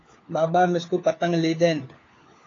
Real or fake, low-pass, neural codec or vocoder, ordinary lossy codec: fake; 7.2 kHz; codec, 16 kHz, 4 kbps, FunCodec, trained on LibriTTS, 50 frames a second; AAC, 32 kbps